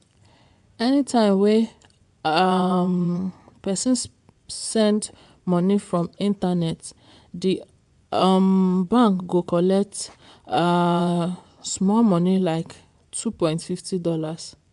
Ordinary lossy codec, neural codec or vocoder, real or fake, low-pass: AAC, 96 kbps; vocoder, 24 kHz, 100 mel bands, Vocos; fake; 10.8 kHz